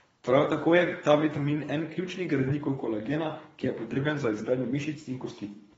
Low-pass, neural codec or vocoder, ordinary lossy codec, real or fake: 10.8 kHz; codec, 24 kHz, 3 kbps, HILCodec; AAC, 24 kbps; fake